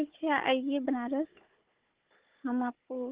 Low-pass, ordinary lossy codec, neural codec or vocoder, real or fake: 3.6 kHz; Opus, 16 kbps; codec, 16 kHz, 4 kbps, FunCodec, trained on Chinese and English, 50 frames a second; fake